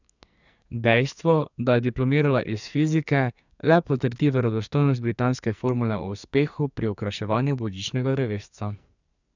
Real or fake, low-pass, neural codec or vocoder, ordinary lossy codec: fake; 7.2 kHz; codec, 44.1 kHz, 2.6 kbps, SNAC; none